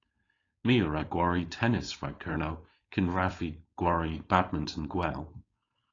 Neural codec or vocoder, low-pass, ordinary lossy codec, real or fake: codec, 16 kHz, 4.8 kbps, FACodec; 7.2 kHz; AAC, 32 kbps; fake